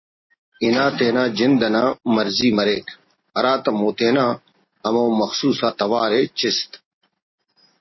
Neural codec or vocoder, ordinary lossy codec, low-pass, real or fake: none; MP3, 24 kbps; 7.2 kHz; real